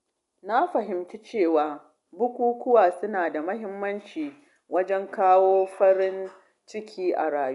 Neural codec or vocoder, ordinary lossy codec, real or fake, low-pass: none; none; real; 10.8 kHz